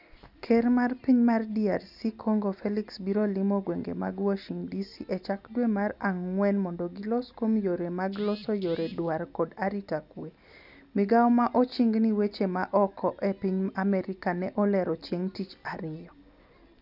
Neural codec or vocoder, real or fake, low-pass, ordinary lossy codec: none; real; 5.4 kHz; none